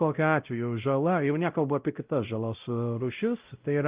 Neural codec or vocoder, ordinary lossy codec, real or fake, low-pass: codec, 16 kHz, 0.5 kbps, X-Codec, WavLM features, trained on Multilingual LibriSpeech; Opus, 24 kbps; fake; 3.6 kHz